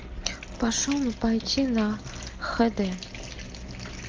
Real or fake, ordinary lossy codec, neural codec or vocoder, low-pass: real; Opus, 24 kbps; none; 7.2 kHz